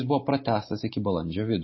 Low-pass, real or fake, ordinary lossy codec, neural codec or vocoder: 7.2 kHz; real; MP3, 24 kbps; none